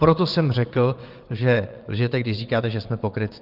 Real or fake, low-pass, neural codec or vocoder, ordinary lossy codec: fake; 5.4 kHz; vocoder, 22.05 kHz, 80 mel bands, WaveNeXt; Opus, 24 kbps